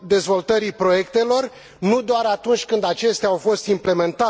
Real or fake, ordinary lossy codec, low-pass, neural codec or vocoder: real; none; none; none